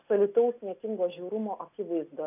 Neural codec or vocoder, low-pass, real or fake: none; 3.6 kHz; real